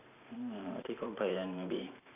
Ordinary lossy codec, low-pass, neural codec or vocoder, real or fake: none; 3.6 kHz; vocoder, 44.1 kHz, 128 mel bands every 512 samples, BigVGAN v2; fake